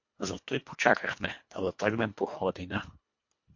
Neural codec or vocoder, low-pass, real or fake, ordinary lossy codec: codec, 24 kHz, 1.5 kbps, HILCodec; 7.2 kHz; fake; MP3, 48 kbps